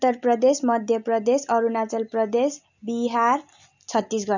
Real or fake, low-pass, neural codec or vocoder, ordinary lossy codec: real; 7.2 kHz; none; none